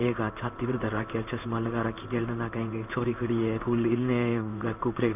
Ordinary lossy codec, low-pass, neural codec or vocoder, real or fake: none; 3.6 kHz; codec, 16 kHz in and 24 kHz out, 1 kbps, XY-Tokenizer; fake